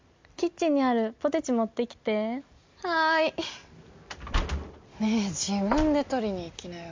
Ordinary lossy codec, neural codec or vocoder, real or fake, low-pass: MP3, 64 kbps; none; real; 7.2 kHz